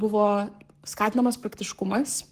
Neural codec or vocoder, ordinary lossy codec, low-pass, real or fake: codec, 44.1 kHz, 7.8 kbps, Pupu-Codec; Opus, 24 kbps; 14.4 kHz; fake